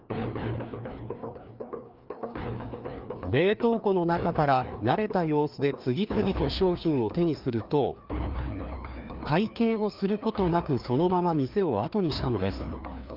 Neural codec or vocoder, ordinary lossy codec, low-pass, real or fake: codec, 16 kHz, 2 kbps, FreqCodec, larger model; Opus, 24 kbps; 5.4 kHz; fake